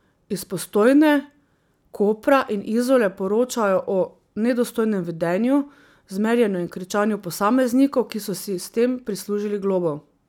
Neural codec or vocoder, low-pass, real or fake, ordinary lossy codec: none; 19.8 kHz; real; none